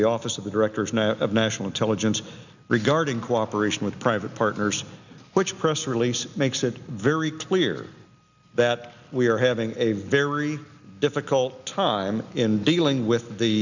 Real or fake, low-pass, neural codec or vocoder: real; 7.2 kHz; none